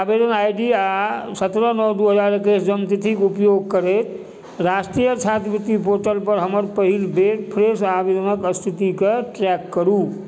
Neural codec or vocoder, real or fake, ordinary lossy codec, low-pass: none; real; none; none